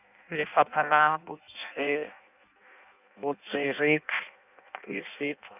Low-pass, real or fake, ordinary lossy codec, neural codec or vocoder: 3.6 kHz; fake; none; codec, 16 kHz in and 24 kHz out, 0.6 kbps, FireRedTTS-2 codec